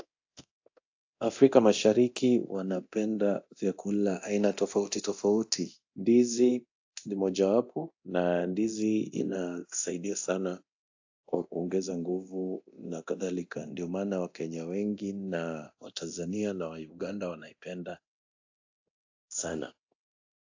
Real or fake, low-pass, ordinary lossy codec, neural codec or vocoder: fake; 7.2 kHz; AAC, 48 kbps; codec, 24 kHz, 0.9 kbps, DualCodec